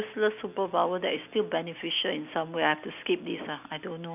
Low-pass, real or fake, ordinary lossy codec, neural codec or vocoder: 3.6 kHz; real; none; none